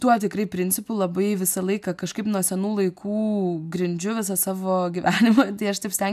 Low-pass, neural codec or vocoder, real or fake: 14.4 kHz; none; real